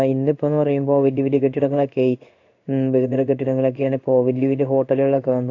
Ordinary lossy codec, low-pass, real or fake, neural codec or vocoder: AAC, 48 kbps; 7.2 kHz; fake; codec, 16 kHz in and 24 kHz out, 1 kbps, XY-Tokenizer